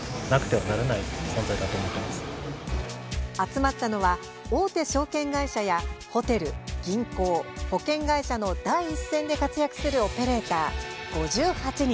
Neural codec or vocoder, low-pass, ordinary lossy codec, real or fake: none; none; none; real